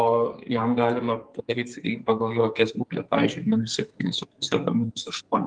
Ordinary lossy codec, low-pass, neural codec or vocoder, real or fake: Opus, 32 kbps; 9.9 kHz; codec, 44.1 kHz, 2.6 kbps, SNAC; fake